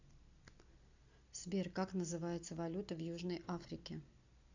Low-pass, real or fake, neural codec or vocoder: 7.2 kHz; real; none